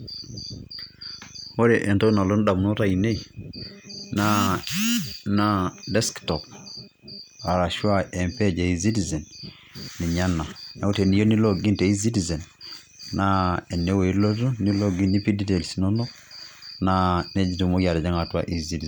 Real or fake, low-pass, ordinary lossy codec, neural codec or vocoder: real; none; none; none